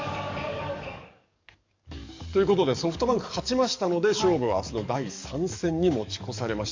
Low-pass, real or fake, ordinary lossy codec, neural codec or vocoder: 7.2 kHz; fake; MP3, 64 kbps; codec, 44.1 kHz, 7.8 kbps, DAC